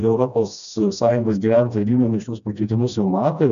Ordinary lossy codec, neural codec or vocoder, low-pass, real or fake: AAC, 96 kbps; codec, 16 kHz, 1 kbps, FreqCodec, smaller model; 7.2 kHz; fake